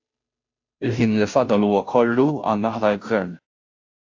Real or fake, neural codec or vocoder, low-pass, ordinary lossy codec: fake; codec, 16 kHz, 0.5 kbps, FunCodec, trained on Chinese and English, 25 frames a second; 7.2 kHz; AAC, 48 kbps